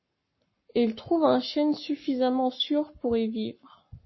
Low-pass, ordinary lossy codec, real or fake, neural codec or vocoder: 7.2 kHz; MP3, 24 kbps; fake; codec, 44.1 kHz, 7.8 kbps, Pupu-Codec